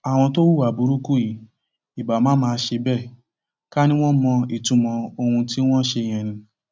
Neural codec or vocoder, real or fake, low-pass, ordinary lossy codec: none; real; none; none